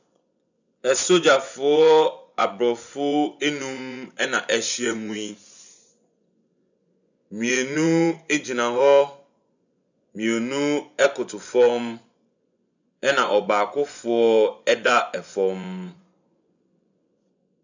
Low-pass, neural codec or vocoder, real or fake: 7.2 kHz; vocoder, 24 kHz, 100 mel bands, Vocos; fake